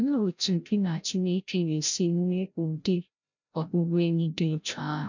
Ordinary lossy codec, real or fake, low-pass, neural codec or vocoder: none; fake; 7.2 kHz; codec, 16 kHz, 0.5 kbps, FreqCodec, larger model